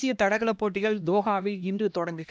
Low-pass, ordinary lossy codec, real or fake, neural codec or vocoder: none; none; fake; codec, 16 kHz, 1 kbps, X-Codec, HuBERT features, trained on LibriSpeech